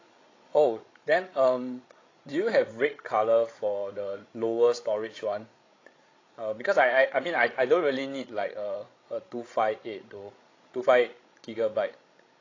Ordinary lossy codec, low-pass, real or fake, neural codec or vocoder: AAC, 32 kbps; 7.2 kHz; fake; codec, 16 kHz, 16 kbps, FreqCodec, larger model